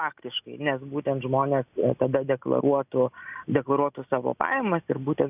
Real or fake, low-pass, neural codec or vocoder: real; 3.6 kHz; none